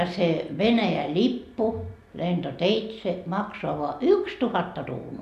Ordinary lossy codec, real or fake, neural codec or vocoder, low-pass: none; real; none; 14.4 kHz